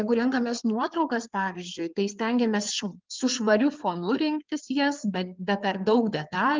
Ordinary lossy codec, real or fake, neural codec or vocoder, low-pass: Opus, 32 kbps; fake; codec, 16 kHz in and 24 kHz out, 2.2 kbps, FireRedTTS-2 codec; 7.2 kHz